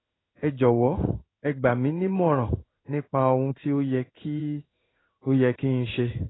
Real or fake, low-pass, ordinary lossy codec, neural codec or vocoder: fake; 7.2 kHz; AAC, 16 kbps; codec, 16 kHz in and 24 kHz out, 1 kbps, XY-Tokenizer